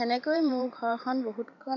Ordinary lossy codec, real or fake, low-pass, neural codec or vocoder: none; fake; 7.2 kHz; vocoder, 44.1 kHz, 128 mel bands every 512 samples, BigVGAN v2